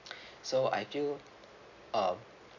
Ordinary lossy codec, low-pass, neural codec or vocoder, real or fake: none; 7.2 kHz; none; real